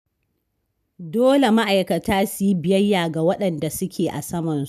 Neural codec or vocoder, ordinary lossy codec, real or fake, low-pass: none; none; real; 14.4 kHz